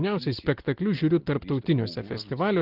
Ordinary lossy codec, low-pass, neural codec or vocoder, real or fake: Opus, 16 kbps; 5.4 kHz; none; real